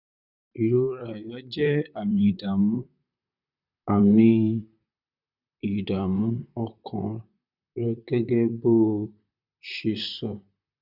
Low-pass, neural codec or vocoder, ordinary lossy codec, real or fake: 5.4 kHz; vocoder, 44.1 kHz, 128 mel bands, Pupu-Vocoder; none; fake